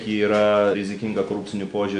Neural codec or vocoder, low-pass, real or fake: none; 9.9 kHz; real